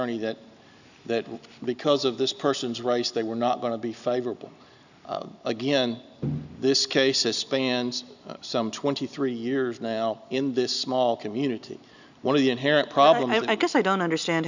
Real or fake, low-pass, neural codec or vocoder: real; 7.2 kHz; none